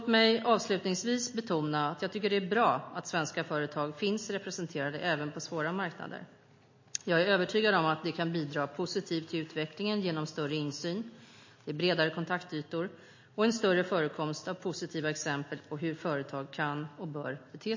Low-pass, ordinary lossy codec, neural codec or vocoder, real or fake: 7.2 kHz; MP3, 32 kbps; none; real